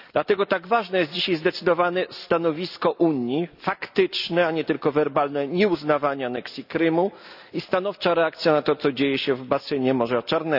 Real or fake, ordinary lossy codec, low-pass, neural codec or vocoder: real; none; 5.4 kHz; none